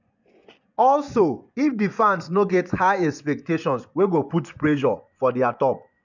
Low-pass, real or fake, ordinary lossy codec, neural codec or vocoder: 7.2 kHz; fake; none; vocoder, 24 kHz, 100 mel bands, Vocos